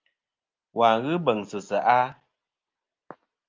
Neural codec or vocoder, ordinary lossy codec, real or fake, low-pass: none; Opus, 32 kbps; real; 7.2 kHz